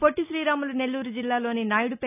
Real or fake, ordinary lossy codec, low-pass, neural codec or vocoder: real; none; 3.6 kHz; none